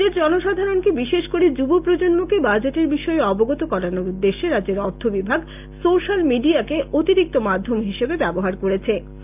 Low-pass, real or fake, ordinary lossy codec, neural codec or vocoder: 3.6 kHz; real; none; none